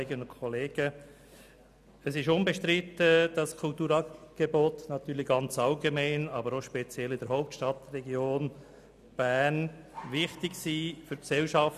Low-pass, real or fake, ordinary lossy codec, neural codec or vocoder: 14.4 kHz; real; none; none